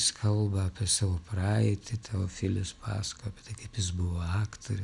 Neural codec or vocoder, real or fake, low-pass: none; real; 10.8 kHz